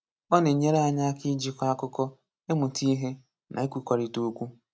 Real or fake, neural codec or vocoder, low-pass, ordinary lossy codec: real; none; none; none